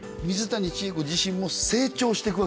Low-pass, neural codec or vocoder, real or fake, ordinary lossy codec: none; none; real; none